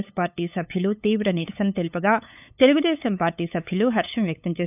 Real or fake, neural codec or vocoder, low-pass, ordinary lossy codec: fake; codec, 16 kHz, 8 kbps, FunCodec, trained on LibriTTS, 25 frames a second; 3.6 kHz; none